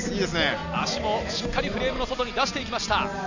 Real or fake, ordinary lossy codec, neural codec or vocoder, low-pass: real; none; none; 7.2 kHz